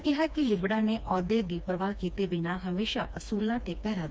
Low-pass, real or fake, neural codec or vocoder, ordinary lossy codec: none; fake; codec, 16 kHz, 2 kbps, FreqCodec, smaller model; none